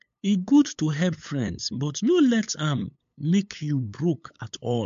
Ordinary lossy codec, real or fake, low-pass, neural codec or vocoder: MP3, 48 kbps; fake; 7.2 kHz; codec, 16 kHz, 8 kbps, FunCodec, trained on LibriTTS, 25 frames a second